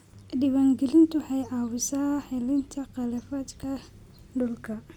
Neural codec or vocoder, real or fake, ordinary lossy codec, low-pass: none; real; none; 19.8 kHz